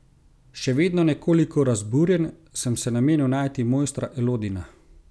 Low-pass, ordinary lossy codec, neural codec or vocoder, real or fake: none; none; none; real